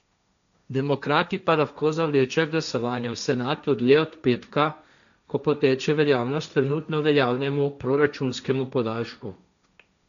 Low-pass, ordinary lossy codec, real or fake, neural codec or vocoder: 7.2 kHz; none; fake; codec, 16 kHz, 1.1 kbps, Voila-Tokenizer